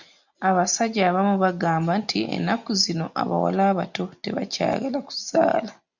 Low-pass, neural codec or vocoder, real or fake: 7.2 kHz; none; real